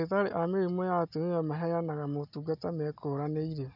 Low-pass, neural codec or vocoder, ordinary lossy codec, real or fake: 5.4 kHz; none; none; real